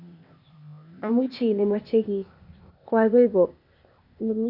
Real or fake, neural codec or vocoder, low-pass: fake; codec, 16 kHz, 0.8 kbps, ZipCodec; 5.4 kHz